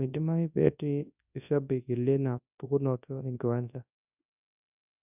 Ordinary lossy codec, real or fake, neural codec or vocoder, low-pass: none; fake; codec, 24 kHz, 0.9 kbps, WavTokenizer, large speech release; 3.6 kHz